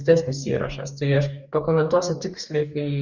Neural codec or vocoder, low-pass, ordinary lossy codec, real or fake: codec, 44.1 kHz, 2.6 kbps, DAC; 7.2 kHz; Opus, 64 kbps; fake